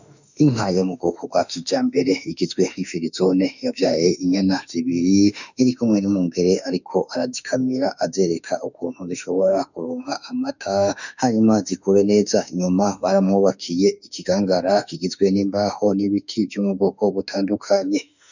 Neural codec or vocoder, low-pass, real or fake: autoencoder, 48 kHz, 32 numbers a frame, DAC-VAE, trained on Japanese speech; 7.2 kHz; fake